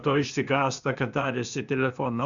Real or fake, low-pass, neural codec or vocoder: fake; 7.2 kHz; codec, 16 kHz, 0.8 kbps, ZipCodec